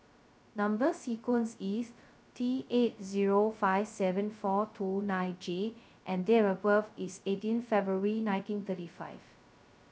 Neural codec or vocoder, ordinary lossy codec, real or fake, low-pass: codec, 16 kHz, 0.2 kbps, FocalCodec; none; fake; none